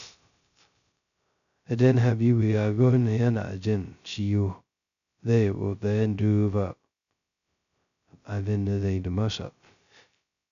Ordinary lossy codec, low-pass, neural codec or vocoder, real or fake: none; 7.2 kHz; codec, 16 kHz, 0.2 kbps, FocalCodec; fake